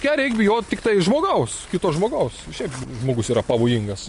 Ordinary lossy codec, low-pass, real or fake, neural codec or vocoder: MP3, 48 kbps; 10.8 kHz; real; none